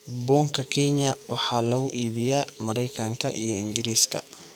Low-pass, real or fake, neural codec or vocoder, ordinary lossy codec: none; fake; codec, 44.1 kHz, 2.6 kbps, SNAC; none